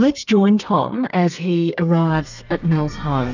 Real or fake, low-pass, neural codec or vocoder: fake; 7.2 kHz; codec, 32 kHz, 1.9 kbps, SNAC